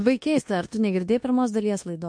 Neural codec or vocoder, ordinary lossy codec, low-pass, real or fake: codec, 24 kHz, 1.2 kbps, DualCodec; MP3, 48 kbps; 9.9 kHz; fake